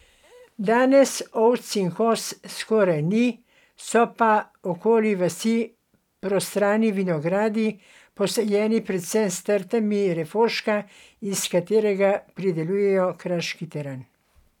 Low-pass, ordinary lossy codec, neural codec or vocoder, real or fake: 19.8 kHz; none; vocoder, 44.1 kHz, 128 mel bands every 256 samples, BigVGAN v2; fake